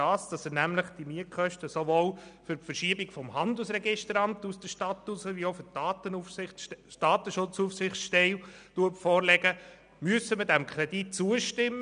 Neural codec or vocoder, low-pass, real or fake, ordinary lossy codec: none; 9.9 kHz; real; none